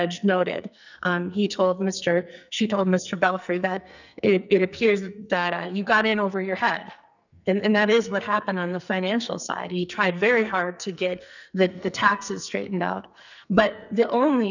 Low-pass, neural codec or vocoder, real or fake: 7.2 kHz; codec, 44.1 kHz, 2.6 kbps, SNAC; fake